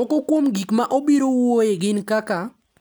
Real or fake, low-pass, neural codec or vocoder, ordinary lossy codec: real; none; none; none